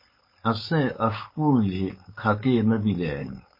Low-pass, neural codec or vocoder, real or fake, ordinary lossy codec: 5.4 kHz; codec, 16 kHz, 4.8 kbps, FACodec; fake; MP3, 24 kbps